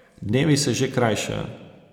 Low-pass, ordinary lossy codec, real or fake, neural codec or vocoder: 19.8 kHz; none; real; none